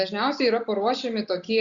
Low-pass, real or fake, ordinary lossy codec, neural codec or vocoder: 7.2 kHz; real; Opus, 64 kbps; none